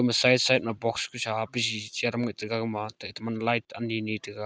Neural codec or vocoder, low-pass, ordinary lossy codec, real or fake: none; none; none; real